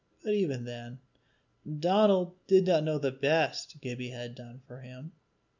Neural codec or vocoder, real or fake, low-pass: none; real; 7.2 kHz